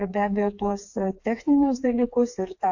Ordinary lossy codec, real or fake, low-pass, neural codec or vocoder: AAC, 48 kbps; fake; 7.2 kHz; codec, 16 kHz, 4 kbps, FreqCodec, smaller model